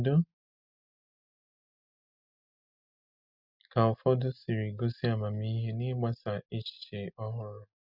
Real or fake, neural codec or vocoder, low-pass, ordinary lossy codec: real; none; 5.4 kHz; Opus, 64 kbps